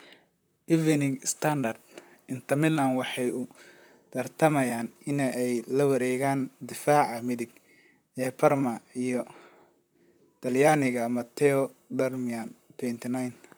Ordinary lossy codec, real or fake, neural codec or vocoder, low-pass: none; fake; vocoder, 44.1 kHz, 128 mel bands, Pupu-Vocoder; none